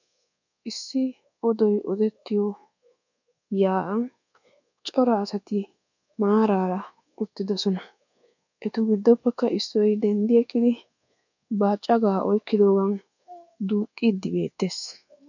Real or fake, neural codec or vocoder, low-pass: fake; codec, 24 kHz, 1.2 kbps, DualCodec; 7.2 kHz